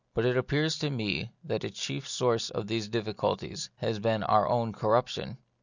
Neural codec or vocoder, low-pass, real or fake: none; 7.2 kHz; real